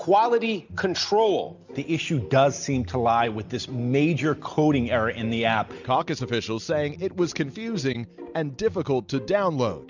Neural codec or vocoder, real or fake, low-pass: none; real; 7.2 kHz